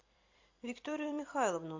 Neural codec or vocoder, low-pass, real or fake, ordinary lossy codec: vocoder, 44.1 kHz, 128 mel bands every 256 samples, BigVGAN v2; 7.2 kHz; fake; AAC, 48 kbps